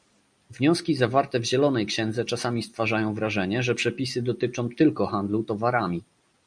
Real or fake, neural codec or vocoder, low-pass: real; none; 9.9 kHz